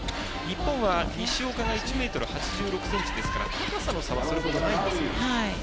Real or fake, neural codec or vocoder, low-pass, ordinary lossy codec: real; none; none; none